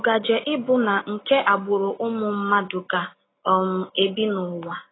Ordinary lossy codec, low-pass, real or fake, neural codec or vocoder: AAC, 16 kbps; 7.2 kHz; real; none